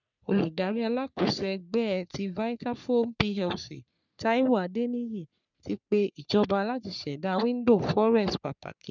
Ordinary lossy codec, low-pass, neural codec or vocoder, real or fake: none; 7.2 kHz; codec, 44.1 kHz, 3.4 kbps, Pupu-Codec; fake